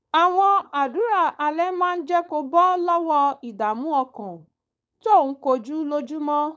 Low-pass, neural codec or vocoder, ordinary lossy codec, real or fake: none; codec, 16 kHz, 4.8 kbps, FACodec; none; fake